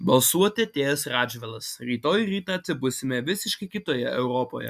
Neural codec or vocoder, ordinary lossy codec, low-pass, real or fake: none; MP3, 96 kbps; 14.4 kHz; real